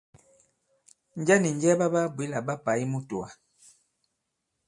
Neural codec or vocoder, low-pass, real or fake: none; 10.8 kHz; real